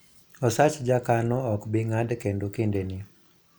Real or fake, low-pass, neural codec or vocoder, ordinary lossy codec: real; none; none; none